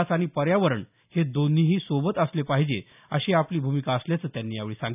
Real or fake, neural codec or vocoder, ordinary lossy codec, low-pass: real; none; none; 3.6 kHz